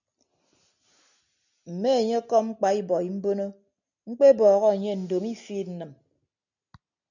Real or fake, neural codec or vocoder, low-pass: real; none; 7.2 kHz